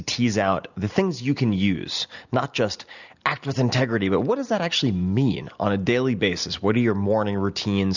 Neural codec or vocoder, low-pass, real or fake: none; 7.2 kHz; real